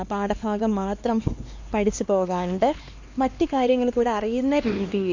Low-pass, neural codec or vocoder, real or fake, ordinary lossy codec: 7.2 kHz; codec, 16 kHz, 2 kbps, X-Codec, HuBERT features, trained on LibriSpeech; fake; MP3, 48 kbps